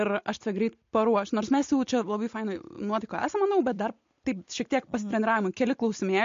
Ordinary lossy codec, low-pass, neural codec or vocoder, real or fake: MP3, 48 kbps; 7.2 kHz; none; real